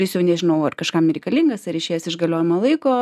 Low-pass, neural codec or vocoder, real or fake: 14.4 kHz; none; real